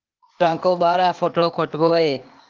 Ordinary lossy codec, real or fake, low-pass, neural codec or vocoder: Opus, 24 kbps; fake; 7.2 kHz; codec, 16 kHz, 0.8 kbps, ZipCodec